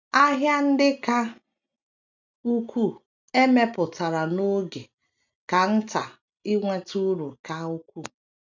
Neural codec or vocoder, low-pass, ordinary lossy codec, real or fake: none; 7.2 kHz; none; real